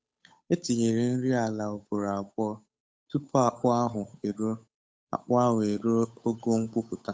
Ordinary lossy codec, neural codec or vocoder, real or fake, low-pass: none; codec, 16 kHz, 8 kbps, FunCodec, trained on Chinese and English, 25 frames a second; fake; none